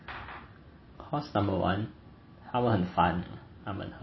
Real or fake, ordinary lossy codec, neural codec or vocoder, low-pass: real; MP3, 24 kbps; none; 7.2 kHz